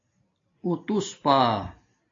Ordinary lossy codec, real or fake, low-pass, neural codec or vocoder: AAC, 32 kbps; real; 7.2 kHz; none